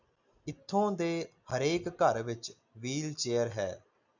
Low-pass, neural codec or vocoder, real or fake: 7.2 kHz; none; real